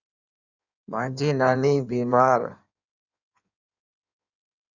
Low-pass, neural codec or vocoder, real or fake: 7.2 kHz; codec, 16 kHz in and 24 kHz out, 1.1 kbps, FireRedTTS-2 codec; fake